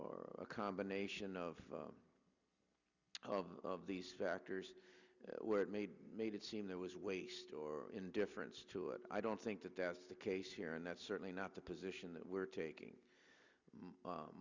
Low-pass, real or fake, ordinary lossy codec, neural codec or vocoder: 7.2 kHz; real; Opus, 64 kbps; none